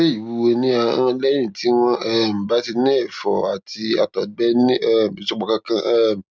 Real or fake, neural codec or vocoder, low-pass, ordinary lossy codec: real; none; none; none